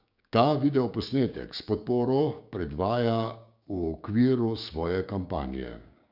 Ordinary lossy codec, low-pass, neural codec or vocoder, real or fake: none; 5.4 kHz; codec, 16 kHz, 6 kbps, DAC; fake